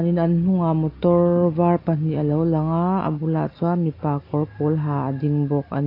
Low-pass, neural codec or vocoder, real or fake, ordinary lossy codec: 5.4 kHz; none; real; AAC, 32 kbps